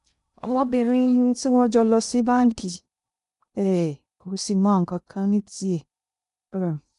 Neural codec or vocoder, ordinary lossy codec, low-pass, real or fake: codec, 16 kHz in and 24 kHz out, 0.6 kbps, FocalCodec, streaming, 2048 codes; none; 10.8 kHz; fake